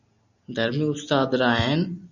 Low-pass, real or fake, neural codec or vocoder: 7.2 kHz; real; none